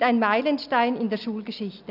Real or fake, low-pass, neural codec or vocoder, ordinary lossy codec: real; 5.4 kHz; none; none